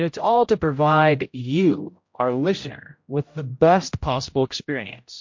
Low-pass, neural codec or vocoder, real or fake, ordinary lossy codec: 7.2 kHz; codec, 16 kHz, 0.5 kbps, X-Codec, HuBERT features, trained on general audio; fake; MP3, 48 kbps